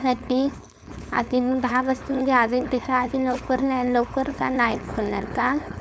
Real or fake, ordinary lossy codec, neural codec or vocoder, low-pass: fake; none; codec, 16 kHz, 4.8 kbps, FACodec; none